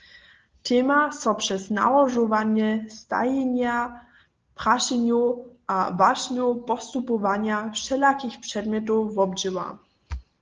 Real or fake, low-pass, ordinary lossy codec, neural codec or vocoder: real; 7.2 kHz; Opus, 16 kbps; none